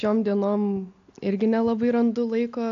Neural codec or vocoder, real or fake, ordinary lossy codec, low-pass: none; real; AAC, 64 kbps; 7.2 kHz